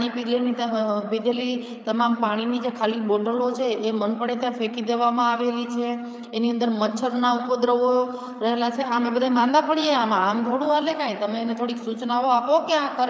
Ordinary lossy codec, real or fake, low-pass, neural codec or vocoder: none; fake; none; codec, 16 kHz, 4 kbps, FreqCodec, larger model